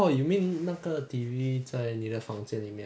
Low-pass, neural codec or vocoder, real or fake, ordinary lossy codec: none; none; real; none